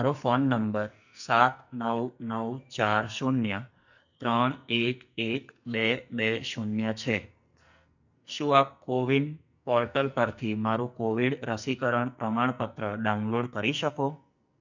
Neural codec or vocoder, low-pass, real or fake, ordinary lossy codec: codec, 44.1 kHz, 2.6 kbps, SNAC; 7.2 kHz; fake; none